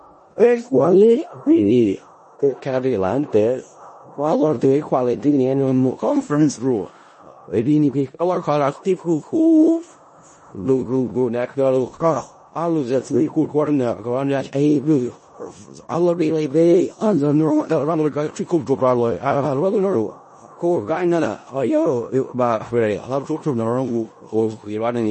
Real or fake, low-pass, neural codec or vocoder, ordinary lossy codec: fake; 10.8 kHz; codec, 16 kHz in and 24 kHz out, 0.4 kbps, LongCat-Audio-Codec, four codebook decoder; MP3, 32 kbps